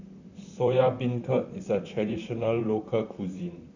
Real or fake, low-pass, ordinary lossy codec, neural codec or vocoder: fake; 7.2 kHz; none; vocoder, 44.1 kHz, 128 mel bands, Pupu-Vocoder